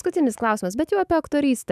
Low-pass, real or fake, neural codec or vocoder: 14.4 kHz; fake; autoencoder, 48 kHz, 128 numbers a frame, DAC-VAE, trained on Japanese speech